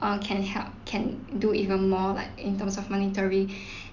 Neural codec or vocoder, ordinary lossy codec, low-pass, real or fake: none; none; 7.2 kHz; real